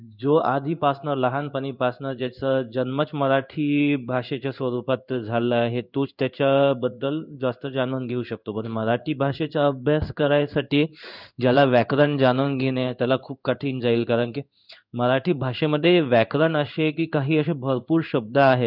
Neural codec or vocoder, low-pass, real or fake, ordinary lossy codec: codec, 16 kHz in and 24 kHz out, 1 kbps, XY-Tokenizer; 5.4 kHz; fake; none